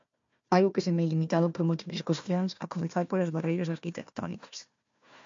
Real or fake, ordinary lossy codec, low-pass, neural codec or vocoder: fake; MP3, 48 kbps; 7.2 kHz; codec, 16 kHz, 1 kbps, FunCodec, trained on Chinese and English, 50 frames a second